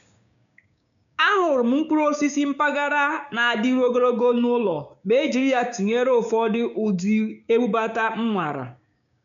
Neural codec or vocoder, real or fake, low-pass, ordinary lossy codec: codec, 16 kHz, 6 kbps, DAC; fake; 7.2 kHz; none